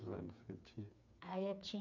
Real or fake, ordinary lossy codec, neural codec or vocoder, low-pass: fake; none; codec, 16 kHz, 4 kbps, FreqCodec, smaller model; 7.2 kHz